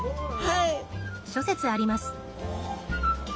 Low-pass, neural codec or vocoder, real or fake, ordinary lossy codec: none; none; real; none